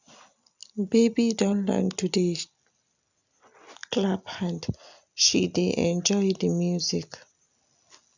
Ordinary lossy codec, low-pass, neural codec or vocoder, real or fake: none; 7.2 kHz; none; real